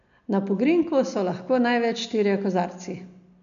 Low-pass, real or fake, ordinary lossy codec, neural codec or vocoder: 7.2 kHz; real; none; none